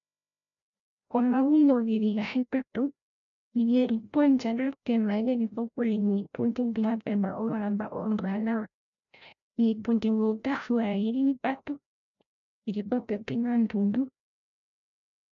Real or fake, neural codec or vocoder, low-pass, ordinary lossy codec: fake; codec, 16 kHz, 0.5 kbps, FreqCodec, larger model; 7.2 kHz; MP3, 96 kbps